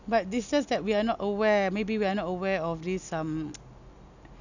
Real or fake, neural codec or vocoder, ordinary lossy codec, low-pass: real; none; none; 7.2 kHz